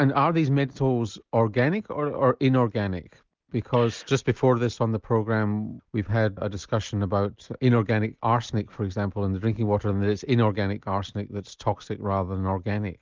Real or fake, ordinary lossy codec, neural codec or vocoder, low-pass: real; Opus, 32 kbps; none; 7.2 kHz